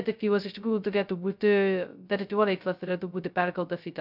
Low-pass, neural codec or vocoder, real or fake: 5.4 kHz; codec, 16 kHz, 0.2 kbps, FocalCodec; fake